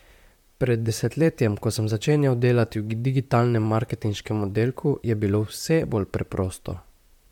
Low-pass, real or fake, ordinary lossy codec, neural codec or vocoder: 19.8 kHz; fake; MP3, 96 kbps; vocoder, 44.1 kHz, 128 mel bands, Pupu-Vocoder